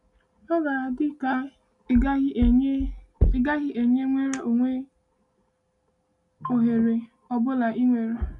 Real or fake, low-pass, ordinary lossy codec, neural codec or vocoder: real; 10.8 kHz; none; none